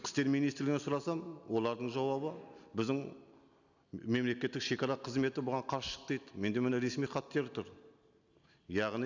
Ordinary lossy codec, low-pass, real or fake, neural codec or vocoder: none; 7.2 kHz; real; none